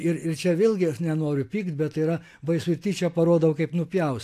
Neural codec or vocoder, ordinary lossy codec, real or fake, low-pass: none; AAC, 64 kbps; real; 14.4 kHz